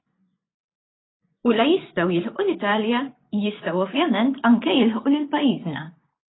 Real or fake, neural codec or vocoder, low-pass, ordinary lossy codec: fake; vocoder, 24 kHz, 100 mel bands, Vocos; 7.2 kHz; AAC, 16 kbps